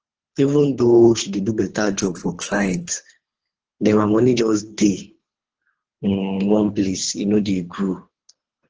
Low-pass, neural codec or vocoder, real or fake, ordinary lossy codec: 7.2 kHz; codec, 24 kHz, 3 kbps, HILCodec; fake; Opus, 16 kbps